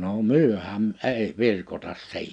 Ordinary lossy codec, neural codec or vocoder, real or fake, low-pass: none; none; real; 9.9 kHz